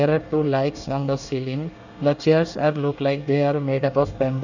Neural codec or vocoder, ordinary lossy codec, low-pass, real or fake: codec, 24 kHz, 1 kbps, SNAC; none; 7.2 kHz; fake